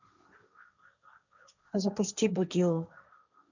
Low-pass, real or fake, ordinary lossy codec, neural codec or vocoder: 7.2 kHz; fake; none; codec, 16 kHz, 1.1 kbps, Voila-Tokenizer